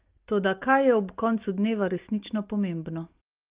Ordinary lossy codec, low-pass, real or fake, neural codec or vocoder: Opus, 32 kbps; 3.6 kHz; real; none